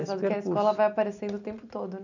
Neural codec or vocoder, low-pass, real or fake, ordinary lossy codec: none; 7.2 kHz; real; none